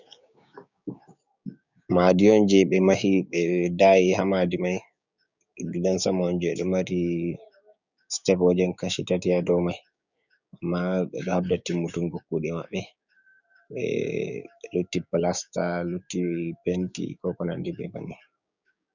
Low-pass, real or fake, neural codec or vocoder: 7.2 kHz; fake; codec, 16 kHz, 6 kbps, DAC